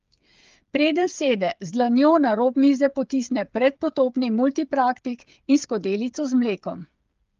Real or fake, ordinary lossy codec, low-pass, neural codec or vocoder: fake; Opus, 32 kbps; 7.2 kHz; codec, 16 kHz, 8 kbps, FreqCodec, smaller model